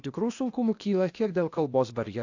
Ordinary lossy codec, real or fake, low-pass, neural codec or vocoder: AAC, 48 kbps; fake; 7.2 kHz; codec, 16 kHz, 0.8 kbps, ZipCodec